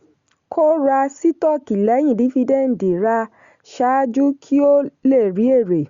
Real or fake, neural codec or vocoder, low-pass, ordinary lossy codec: real; none; 7.2 kHz; Opus, 64 kbps